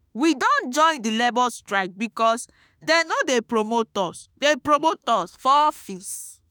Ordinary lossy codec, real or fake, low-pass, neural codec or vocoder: none; fake; none; autoencoder, 48 kHz, 32 numbers a frame, DAC-VAE, trained on Japanese speech